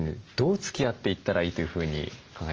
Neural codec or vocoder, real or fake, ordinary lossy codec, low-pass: none; real; Opus, 24 kbps; 7.2 kHz